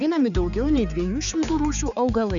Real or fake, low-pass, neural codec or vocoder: fake; 7.2 kHz; codec, 16 kHz, 4 kbps, X-Codec, HuBERT features, trained on balanced general audio